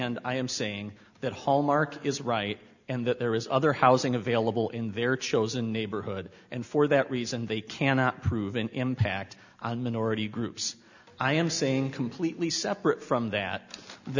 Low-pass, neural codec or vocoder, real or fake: 7.2 kHz; none; real